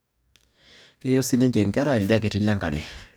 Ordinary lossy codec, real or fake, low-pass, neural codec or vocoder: none; fake; none; codec, 44.1 kHz, 2.6 kbps, DAC